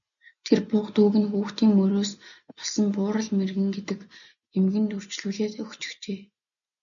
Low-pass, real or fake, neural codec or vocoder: 7.2 kHz; real; none